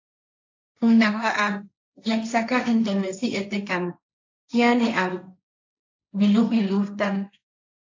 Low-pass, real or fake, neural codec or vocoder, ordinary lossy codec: 7.2 kHz; fake; codec, 16 kHz, 1.1 kbps, Voila-Tokenizer; AAC, 48 kbps